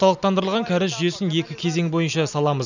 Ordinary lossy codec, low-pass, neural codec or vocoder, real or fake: none; 7.2 kHz; none; real